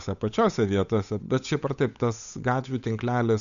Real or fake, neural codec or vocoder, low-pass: fake; codec, 16 kHz, 8 kbps, FunCodec, trained on Chinese and English, 25 frames a second; 7.2 kHz